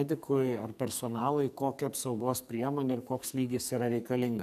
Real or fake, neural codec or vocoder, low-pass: fake; codec, 44.1 kHz, 2.6 kbps, SNAC; 14.4 kHz